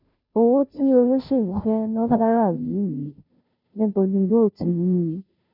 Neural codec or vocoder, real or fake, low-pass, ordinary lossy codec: codec, 16 kHz, 0.5 kbps, FunCodec, trained on Chinese and English, 25 frames a second; fake; 5.4 kHz; none